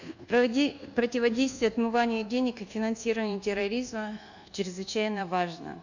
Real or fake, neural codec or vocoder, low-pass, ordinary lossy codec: fake; codec, 24 kHz, 1.2 kbps, DualCodec; 7.2 kHz; none